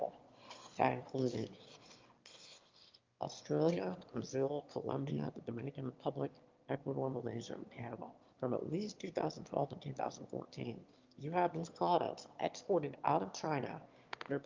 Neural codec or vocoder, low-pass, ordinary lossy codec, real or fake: autoencoder, 22.05 kHz, a latent of 192 numbers a frame, VITS, trained on one speaker; 7.2 kHz; Opus, 32 kbps; fake